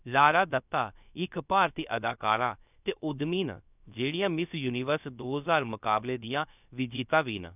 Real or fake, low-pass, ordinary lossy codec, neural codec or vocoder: fake; 3.6 kHz; none; codec, 16 kHz, 0.7 kbps, FocalCodec